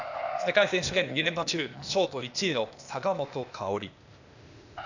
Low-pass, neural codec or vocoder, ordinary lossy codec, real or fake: 7.2 kHz; codec, 16 kHz, 0.8 kbps, ZipCodec; none; fake